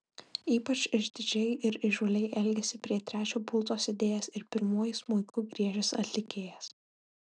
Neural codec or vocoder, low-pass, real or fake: none; 9.9 kHz; real